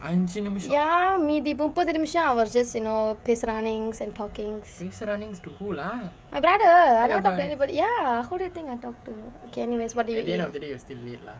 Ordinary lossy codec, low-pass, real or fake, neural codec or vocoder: none; none; fake; codec, 16 kHz, 16 kbps, FreqCodec, smaller model